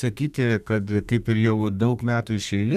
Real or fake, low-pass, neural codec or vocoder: fake; 14.4 kHz; codec, 32 kHz, 1.9 kbps, SNAC